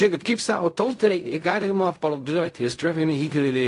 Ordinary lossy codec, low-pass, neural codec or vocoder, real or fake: AAC, 48 kbps; 10.8 kHz; codec, 16 kHz in and 24 kHz out, 0.4 kbps, LongCat-Audio-Codec, fine tuned four codebook decoder; fake